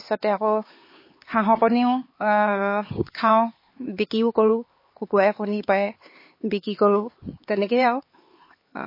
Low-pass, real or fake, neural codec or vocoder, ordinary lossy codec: 5.4 kHz; fake; codec, 16 kHz, 4 kbps, X-Codec, HuBERT features, trained on LibriSpeech; MP3, 24 kbps